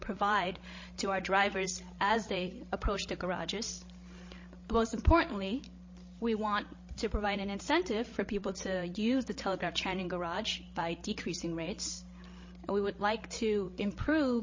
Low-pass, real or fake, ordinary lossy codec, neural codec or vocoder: 7.2 kHz; fake; MP3, 32 kbps; codec, 16 kHz, 16 kbps, FreqCodec, larger model